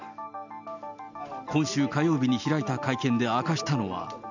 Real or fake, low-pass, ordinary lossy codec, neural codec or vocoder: real; 7.2 kHz; none; none